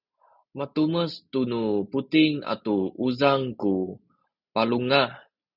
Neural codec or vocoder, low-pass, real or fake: none; 5.4 kHz; real